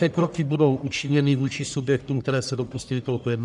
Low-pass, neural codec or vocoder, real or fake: 10.8 kHz; codec, 44.1 kHz, 1.7 kbps, Pupu-Codec; fake